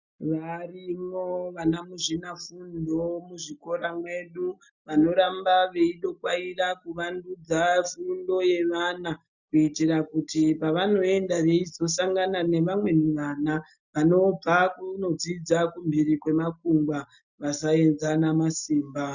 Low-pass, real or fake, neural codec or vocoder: 7.2 kHz; real; none